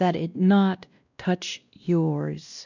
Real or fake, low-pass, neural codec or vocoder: fake; 7.2 kHz; codec, 16 kHz, 1 kbps, X-Codec, WavLM features, trained on Multilingual LibriSpeech